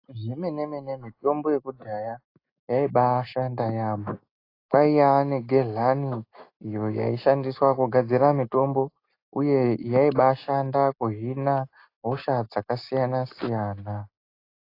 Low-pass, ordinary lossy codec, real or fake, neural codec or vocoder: 5.4 kHz; AAC, 32 kbps; real; none